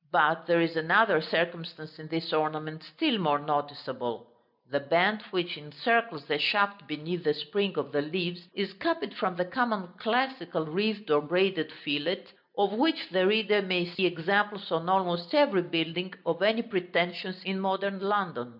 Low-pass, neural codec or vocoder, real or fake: 5.4 kHz; none; real